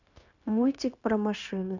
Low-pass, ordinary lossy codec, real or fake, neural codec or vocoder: 7.2 kHz; none; fake; codec, 16 kHz, 0.9 kbps, LongCat-Audio-Codec